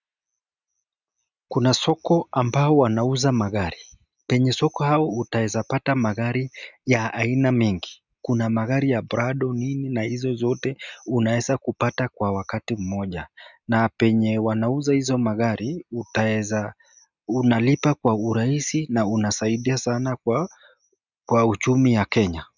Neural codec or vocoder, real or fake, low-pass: none; real; 7.2 kHz